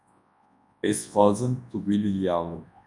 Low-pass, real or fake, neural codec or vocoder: 10.8 kHz; fake; codec, 24 kHz, 0.9 kbps, WavTokenizer, large speech release